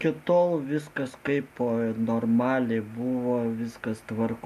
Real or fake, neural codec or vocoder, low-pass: real; none; 14.4 kHz